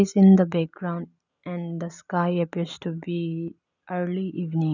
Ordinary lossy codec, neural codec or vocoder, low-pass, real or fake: none; none; 7.2 kHz; real